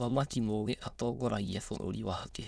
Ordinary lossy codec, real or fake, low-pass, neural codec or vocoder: none; fake; none; autoencoder, 22.05 kHz, a latent of 192 numbers a frame, VITS, trained on many speakers